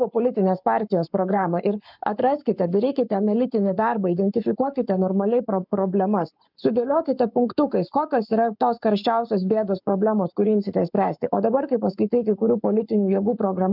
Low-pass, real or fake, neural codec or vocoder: 5.4 kHz; fake; codec, 44.1 kHz, 7.8 kbps, Pupu-Codec